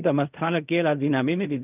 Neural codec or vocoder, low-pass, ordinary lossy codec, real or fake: codec, 16 kHz in and 24 kHz out, 0.4 kbps, LongCat-Audio-Codec, fine tuned four codebook decoder; 3.6 kHz; none; fake